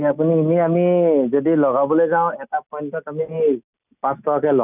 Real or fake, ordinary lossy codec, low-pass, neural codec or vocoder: real; none; 3.6 kHz; none